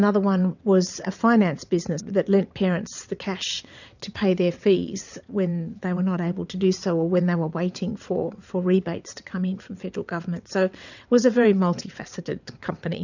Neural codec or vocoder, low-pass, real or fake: vocoder, 22.05 kHz, 80 mel bands, WaveNeXt; 7.2 kHz; fake